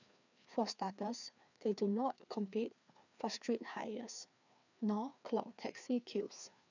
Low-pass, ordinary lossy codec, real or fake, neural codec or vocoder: 7.2 kHz; none; fake; codec, 16 kHz, 2 kbps, FreqCodec, larger model